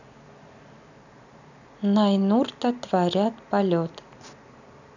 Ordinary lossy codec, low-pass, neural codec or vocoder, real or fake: none; 7.2 kHz; none; real